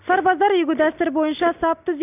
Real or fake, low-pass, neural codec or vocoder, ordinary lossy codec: real; 3.6 kHz; none; none